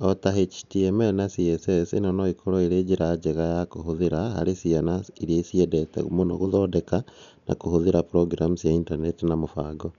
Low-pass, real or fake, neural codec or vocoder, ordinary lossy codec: 7.2 kHz; real; none; none